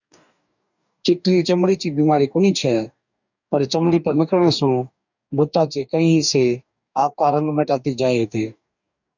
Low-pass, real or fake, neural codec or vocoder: 7.2 kHz; fake; codec, 44.1 kHz, 2.6 kbps, DAC